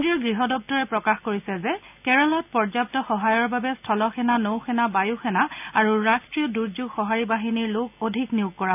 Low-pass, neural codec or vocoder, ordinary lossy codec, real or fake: 3.6 kHz; none; none; real